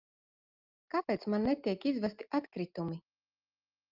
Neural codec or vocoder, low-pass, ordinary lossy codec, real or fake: none; 5.4 kHz; Opus, 24 kbps; real